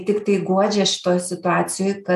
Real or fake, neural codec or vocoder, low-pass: real; none; 14.4 kHz